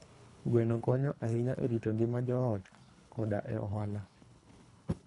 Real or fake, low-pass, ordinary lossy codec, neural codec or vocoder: fake; 10.8 kHz; none; codec, 24 kHz, 3 kbps, HILCodec